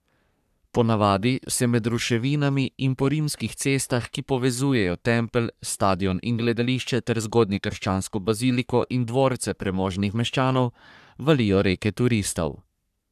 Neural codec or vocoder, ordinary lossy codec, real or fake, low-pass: codec, 44.1 kHz, 3.4 kbps, Pupu-Codec; none; fake; 14.4 kHz